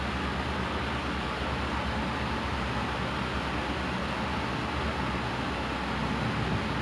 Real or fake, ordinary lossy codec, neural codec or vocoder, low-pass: real; none; none; none